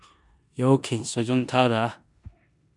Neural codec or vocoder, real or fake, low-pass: codec, 16 kHz in and 24 kHz out, 0.9 kbps, LongCat-Audio-Codec, four codebook decoder; fake; 10.8 kHz